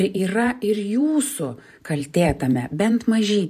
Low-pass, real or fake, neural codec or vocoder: 14.4 kHz; fake; vocoder, 44.1 kHz, 128 mel bands every 512 samples, BigVGAN v2